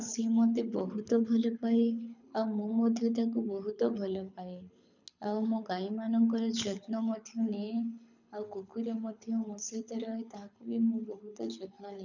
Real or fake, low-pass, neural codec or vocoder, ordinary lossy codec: fake; 7.2 kHz; codec, 24 kHz, 6 kbps, HILCodec; none